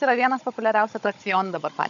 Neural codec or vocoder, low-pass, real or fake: codec, 16 kHz, 16 kbps, FunCodec, trained on Chinese and English, 50 frames a second; 7.2 kHz; fake